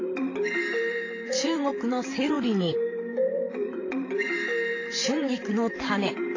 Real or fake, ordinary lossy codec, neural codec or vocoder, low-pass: fake; AAC, 32 kbps; vocoder, 44.1 kHz, 128 mel bands, Pupu-Vocoder; 7.2 kHz